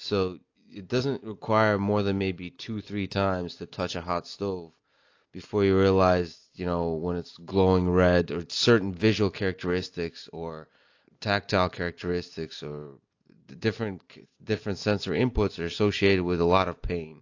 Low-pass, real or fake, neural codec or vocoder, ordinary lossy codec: 7.2 kHz; real; none; AAC, 48 kbps